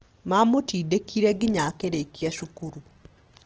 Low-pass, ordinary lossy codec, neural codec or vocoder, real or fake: 7.2 kHz; Opus, 16 kbps; none; real